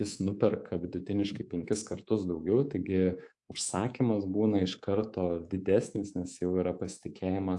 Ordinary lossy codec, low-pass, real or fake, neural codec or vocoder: AAC, 64 kbps; 10.8 kHz; fake; codec, 24 kHz, 3.1 kbps, DualCodec